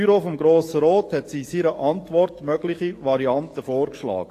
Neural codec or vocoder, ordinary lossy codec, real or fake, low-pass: codec, 44.1 kHz, 7.8 kbps, DAC; AAC, 48 kbps; fake; 14.4 kHz